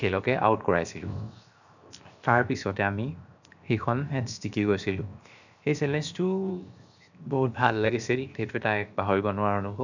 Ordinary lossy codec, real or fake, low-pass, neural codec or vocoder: none; fake; 7.2 kHz; codec, 16 kHz, 0.7 kbps, FocalCodec